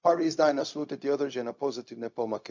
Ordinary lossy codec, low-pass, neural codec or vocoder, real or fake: MP3, 48 kbps; 7.2 kHz; codec, 16 kHz, 0.4 kbps, LongCat-Audio-Codec; fake